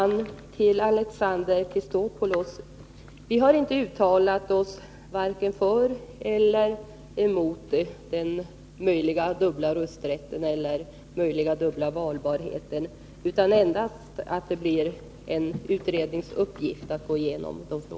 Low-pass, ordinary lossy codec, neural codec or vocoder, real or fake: none; none; none; real